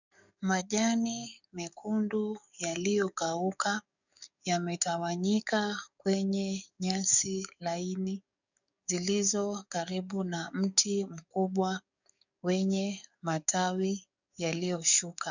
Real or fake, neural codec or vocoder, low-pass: fake; codec, 44.1 kHz, 7.8 kbps, DAC; 7.2 kHz